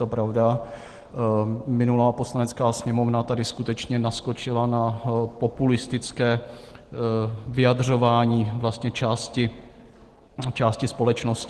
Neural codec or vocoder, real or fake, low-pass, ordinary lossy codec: none; real; 10.8 kHz; Opus, 16 kbps